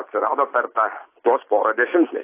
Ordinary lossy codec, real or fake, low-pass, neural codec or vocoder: AAC, 24 kbps; real; 3.6 kHz; none